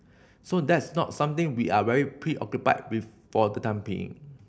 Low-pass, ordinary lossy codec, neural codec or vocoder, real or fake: none; none; none; real